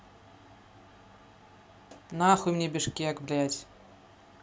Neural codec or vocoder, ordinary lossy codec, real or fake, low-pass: none; none; real; none